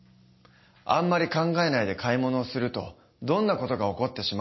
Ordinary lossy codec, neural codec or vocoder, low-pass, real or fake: MP3, 24 kbps; none; 7.2 kHz; real